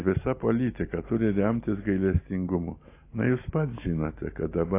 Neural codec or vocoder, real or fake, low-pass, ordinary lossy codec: none; real; 3.6 kHz; AAC, 24 kbps